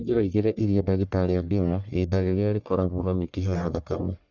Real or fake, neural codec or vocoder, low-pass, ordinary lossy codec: fake; codec, 44.1 kHz, 1.7 kbps, Pupu-Codec; 7.2 kHz; none